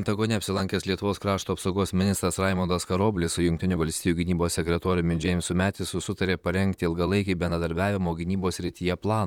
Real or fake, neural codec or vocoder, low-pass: fake; vocoder, 44.1 kHz, 128 mel bands, Pupu-Vocoder; 19.8 kHz